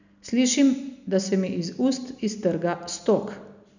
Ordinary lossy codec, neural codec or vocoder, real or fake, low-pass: none; none; real; 7.2 kHz